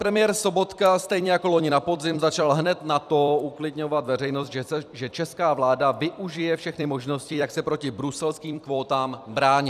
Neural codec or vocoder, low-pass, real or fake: vocoder, 44.1 kHz, 128 mel bands every 256 samples, BigVGAN v2; 14.4 kHz; fake